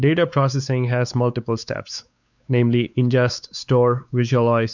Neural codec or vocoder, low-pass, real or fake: codec, 16 kHz, 4 kbps, X-Codec, WavLM features, trained on Multilingual LibriSpeech; 7.2 kHz; fake